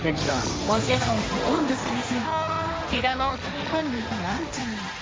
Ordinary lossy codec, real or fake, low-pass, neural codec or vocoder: none; fake; none; codec, 16 kHz, 1.1 kbps, Voila-Tokenizer